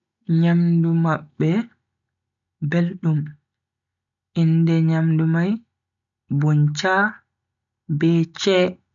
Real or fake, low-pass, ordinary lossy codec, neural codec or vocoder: real; 7.2 kHz; none; none